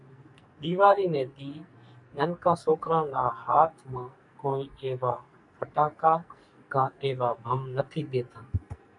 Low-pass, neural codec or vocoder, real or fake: 10.8 kHz; codec, 44.1 kHz, 2.6 kbps, SNAC; fake